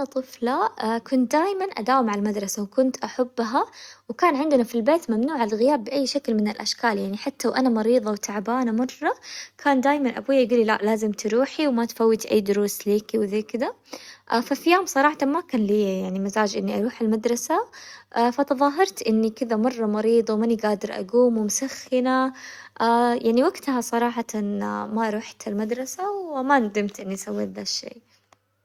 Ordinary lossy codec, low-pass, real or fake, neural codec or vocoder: Opus, 64 kbps; 19.8 kHz; real; none